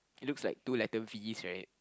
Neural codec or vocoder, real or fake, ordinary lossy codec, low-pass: none; real; none; none